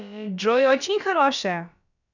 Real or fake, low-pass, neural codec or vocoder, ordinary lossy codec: fake; 7.2 kHz; codec, 16 kHz, about 1 kbps, DyCAST, with the encoder's durations; none